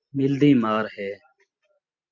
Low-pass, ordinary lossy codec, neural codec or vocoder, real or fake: 7.2 kHz; MP3, 48 kbps; none; real